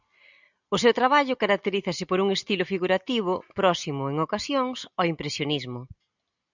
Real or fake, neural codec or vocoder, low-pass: real; none; 7.2 kHz